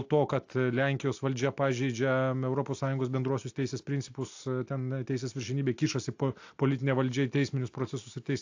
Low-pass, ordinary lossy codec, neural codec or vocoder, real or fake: 7.2 kHz; AAC, 48 kbps; none; real